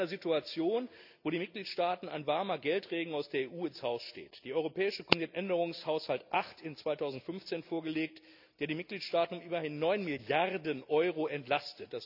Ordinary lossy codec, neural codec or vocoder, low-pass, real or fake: none; none; 5.4 kHz; real